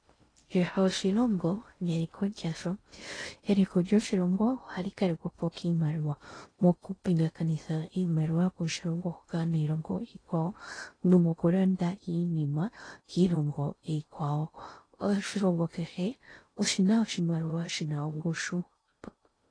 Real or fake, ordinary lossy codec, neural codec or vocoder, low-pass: fake; AAC, 32 kbps; codec, 16 kHz in and 24 kHz out, 0.6 kbps, FocalCodec, streaming, 2048 codes; 9.9 kHz